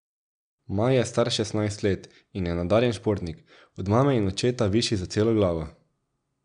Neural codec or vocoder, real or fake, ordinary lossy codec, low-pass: none; real; none; 9.9 kHz